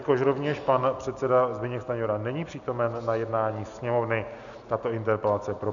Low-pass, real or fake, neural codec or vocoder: 7.2 kHz; real; none